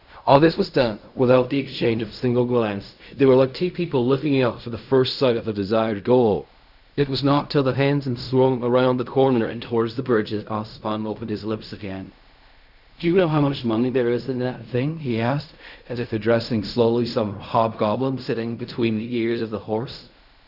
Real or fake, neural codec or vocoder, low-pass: fake; codec, 16 kHz in and 24 kHz out, 0.4 kbps, LongCat-Audio-Codec, fine tuned four codebook decoder; 5.4 kHz